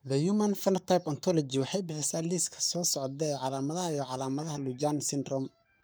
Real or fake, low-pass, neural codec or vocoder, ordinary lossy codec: fake; none; codec, 44.1 kHz, 7.8 kbps, Pupu-Codec; none